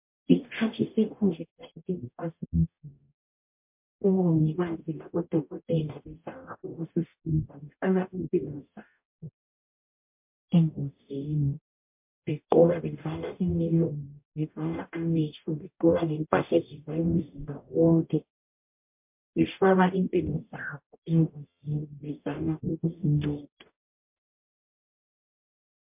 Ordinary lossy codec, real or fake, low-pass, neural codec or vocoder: MP3, 32 kbps; fake; 3.6 kHz; codec, 44.1 kHz, 0.9 kbps, DAC